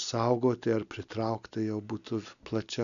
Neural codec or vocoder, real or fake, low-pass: none; real; 7.2 kHz